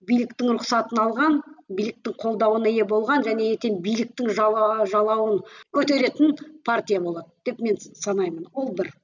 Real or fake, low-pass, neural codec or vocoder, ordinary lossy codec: real; 7.2 kHz; none; none